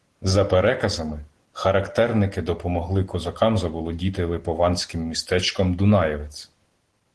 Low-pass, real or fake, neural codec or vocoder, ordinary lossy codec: 10.8 kHz; real; none; Opus, 16 kbps